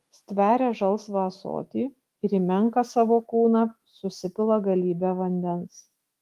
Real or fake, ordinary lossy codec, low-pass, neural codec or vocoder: fake; Opus, 24 kbps; 14.4 kHz; autoencoder, 48 kHz, 128 numbers a frame, DAC-VAE, trained on Japanese speech